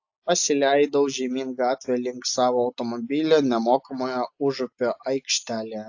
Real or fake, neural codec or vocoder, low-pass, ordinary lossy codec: real; none; 7.2 kHz; AAC, 48 kbps